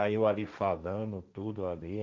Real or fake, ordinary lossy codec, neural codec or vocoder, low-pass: fake; none; codec, 16 kHz, 1.1 kbps, Voila-Tokenizer; none